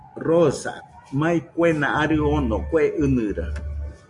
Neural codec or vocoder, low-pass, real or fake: none; 10.8 kHz; real